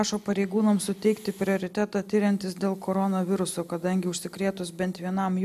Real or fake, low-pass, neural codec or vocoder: real; 14.4 kHz; none